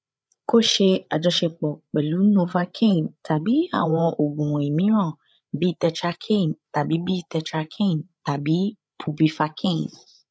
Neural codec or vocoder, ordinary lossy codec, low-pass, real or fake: codec, 16 kHz, 8 kbps, FreqCodec, larger model; none; none; fake